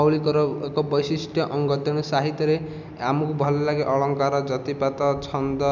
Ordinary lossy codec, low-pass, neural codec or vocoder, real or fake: none; 7.2 kHz; none; real